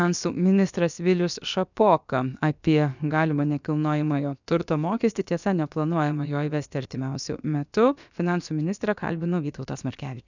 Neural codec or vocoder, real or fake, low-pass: codec, 16 kHz, about 1 kbps, DyCAST, with the encoder's durations; fake; 7.2 kHz